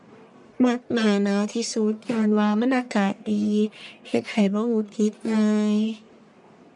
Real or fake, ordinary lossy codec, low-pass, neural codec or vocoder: fake; none; 10.8 kHz; codec, 44.1 kHz, 1.7 kbps, Pupu-Codec